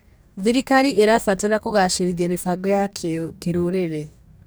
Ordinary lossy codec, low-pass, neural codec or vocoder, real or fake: none; none; codec, 44.1 kHz, 2.6 kbps, DAC; fake